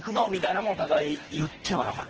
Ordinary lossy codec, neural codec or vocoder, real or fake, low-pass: Opus, 16 kbps; codec, 24 kHz, 1.5 kbps, HILCodec; fake; 7.2 kHz